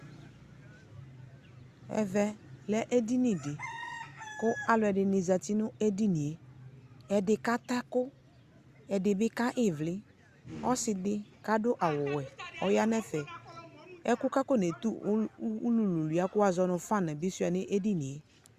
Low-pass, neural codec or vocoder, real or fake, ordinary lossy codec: 14.4 kHz; none; real; Opus, 64 kbps